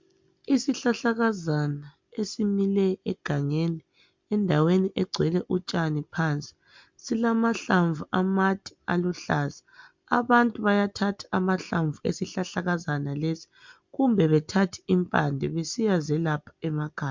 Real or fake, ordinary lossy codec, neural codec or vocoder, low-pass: real; MP3, 64 kbps; none; 7.2 kHz